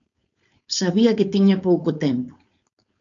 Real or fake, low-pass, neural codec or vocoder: fake; 7.2 kHz; codec, 16 kHz, 4.8 kbps, FACodec